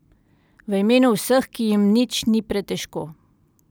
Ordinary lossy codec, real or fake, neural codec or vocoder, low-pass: none; real; none; none